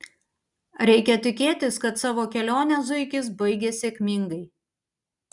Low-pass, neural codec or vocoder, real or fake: 10.8 kHz; none; real